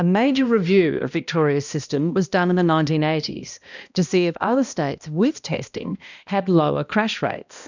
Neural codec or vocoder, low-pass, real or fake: codec, 16 kHz, 1 kbps, X-Codec, HuBERT features, trained on balanced general audio; 7.2 kHz; fake